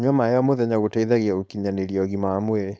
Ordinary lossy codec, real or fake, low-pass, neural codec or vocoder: none; fake; none; codec, 16 kHz, 4.8 kbps, FACodec